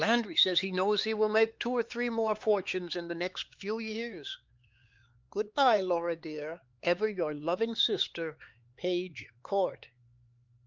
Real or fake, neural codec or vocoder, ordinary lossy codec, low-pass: fake; codec, 16 kHz, 4 kbps, X-Codec, HuBERT features, trained on LibriSpeech; Opus, 32 kbps; 7.2 kHz